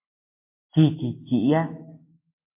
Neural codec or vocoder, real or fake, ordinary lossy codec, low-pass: none; real; MP3, 24 kbps; 3.6 kHz